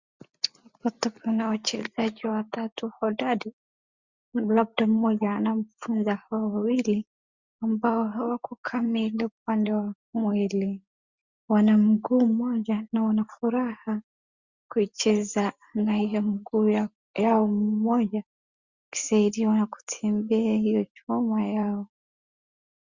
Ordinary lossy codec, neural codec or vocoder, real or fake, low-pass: Opus, 64 kbps; none; real; 7.2 kHz